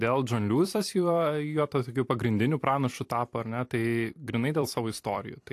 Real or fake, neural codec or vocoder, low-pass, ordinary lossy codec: fake; vocoder, 44.1 kHz, 128 mel bands every 256 samples, BigVGAN v2; 14.4 kHz; AAC, 64 kbps